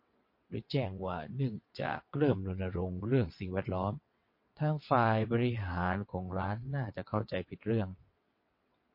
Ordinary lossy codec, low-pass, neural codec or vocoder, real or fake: MP3, 32 kbps; 5.4 kHz; vocoder, 22.05 kHz, 80 mel bands, WaveNeXt; fake